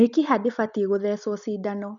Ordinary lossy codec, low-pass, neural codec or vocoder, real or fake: none; 7.2 kHz; none; real